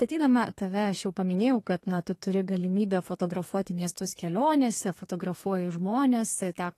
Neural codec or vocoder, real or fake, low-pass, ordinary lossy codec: codec, 32 kHz, 1.9 kbps, SNAC; fake; 14.4 kHz; AAC, 48 kbps